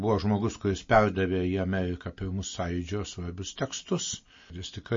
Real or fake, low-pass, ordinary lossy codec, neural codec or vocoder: real; 7.2 kHz; MP3, 32 kbps; none